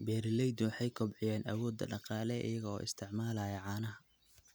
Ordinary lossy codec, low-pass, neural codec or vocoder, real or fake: none; none; none; real